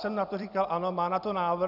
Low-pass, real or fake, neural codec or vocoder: 5.4 kHz; fake; vocoder, 44.1 kHz, 128 mel bands every 256 samples, BigVGAN v2